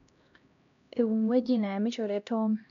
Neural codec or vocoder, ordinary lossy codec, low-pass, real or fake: codec, 16 kHz, 1 kbps, X-Codec, HuBERT features, trained on LibriSpeech; none; 7.2 kHz; fake